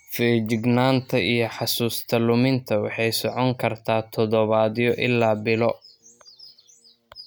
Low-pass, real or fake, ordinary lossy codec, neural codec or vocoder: none; real; none; none